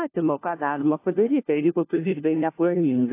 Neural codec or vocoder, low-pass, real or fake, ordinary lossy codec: codec, 16 kHz, 1 kbps, FunCodec, trained on LibriTTS, 50 frames a second; 3.6 kHz; fake; AAC, 24 kbps